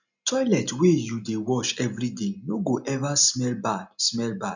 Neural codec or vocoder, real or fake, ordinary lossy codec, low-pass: none; real; none; 7.2 kHz